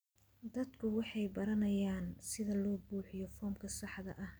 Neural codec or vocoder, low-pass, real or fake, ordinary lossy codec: none; none; real; none